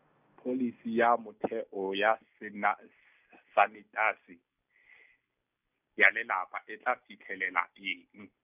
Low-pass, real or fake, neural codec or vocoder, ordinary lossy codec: 3.6 kHz; real; none; none